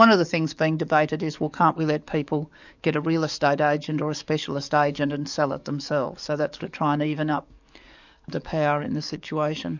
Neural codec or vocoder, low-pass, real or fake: codec, 44.1 kHz, 7.8 kbps, DAC; 7.2 kHz; fake